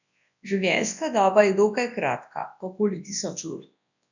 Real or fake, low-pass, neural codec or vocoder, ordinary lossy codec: fake; 7.2 kHz; codec, 24 kHz, 0.9 kbps, WavTokenizer, large speech release; none